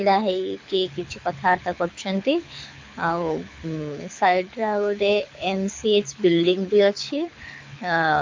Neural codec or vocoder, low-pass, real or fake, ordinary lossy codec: codec, 24 kHz, 6 kbps, HILCodec; 7.2 kHz; fake; MP3, 48 kbps